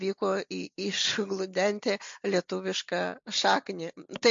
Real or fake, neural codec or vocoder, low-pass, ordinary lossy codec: real; none; 7.2 kHz; MP3, 48 kbps